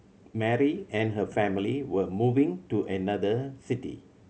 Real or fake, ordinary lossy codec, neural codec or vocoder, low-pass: real; none; none; none